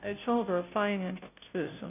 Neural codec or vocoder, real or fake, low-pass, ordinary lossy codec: codec, 16 kHz, 0.5 kbps, FunCodec, trained on Chinese and English, 25 frames a second; fake; 3.6 kHz; none